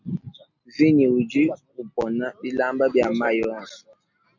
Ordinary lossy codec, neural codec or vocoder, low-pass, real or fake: MP3, 48 kbps; none; 7.2 kHz; real